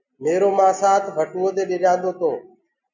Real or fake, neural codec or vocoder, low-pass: real; none; 7.2 kHz